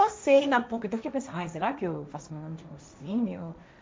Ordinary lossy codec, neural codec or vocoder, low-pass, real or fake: none; codec, 16 kHz, 1.1 kbps, Voila-Tokenizer; none; fake